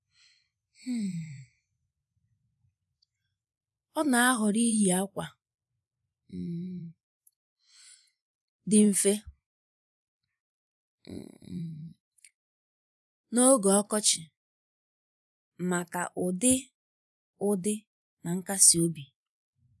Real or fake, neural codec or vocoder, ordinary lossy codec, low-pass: fake; vocoder, 24 kHz, 100 mel bands, Vocos; none; none